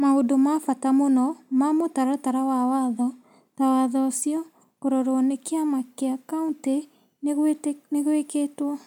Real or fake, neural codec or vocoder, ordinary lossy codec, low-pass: real; none; none; 19.8 kHz